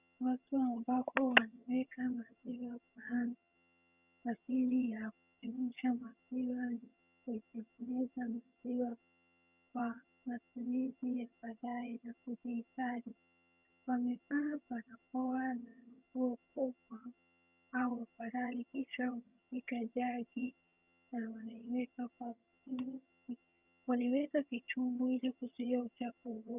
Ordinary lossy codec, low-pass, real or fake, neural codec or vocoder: Opus, 64 kbps; 3.6 kHz; fake; vocoder, 22.05 kHz, 80 mel bands, HiFi-GAN